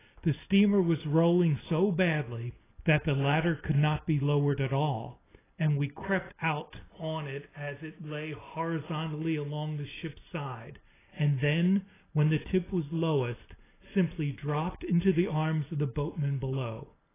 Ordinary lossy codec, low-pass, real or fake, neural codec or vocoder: AAC, 16 kbps; 3.6 kHz; real; none